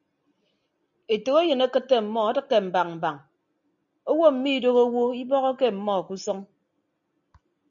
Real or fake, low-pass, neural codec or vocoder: real; 7.2 kHz; none